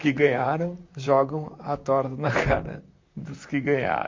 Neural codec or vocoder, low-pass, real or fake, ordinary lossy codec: vocoder, 44.1 kHz, 128 mel bands, Pupu-Vocoder; 7.2 kHz; fake; MP3, 48 kbps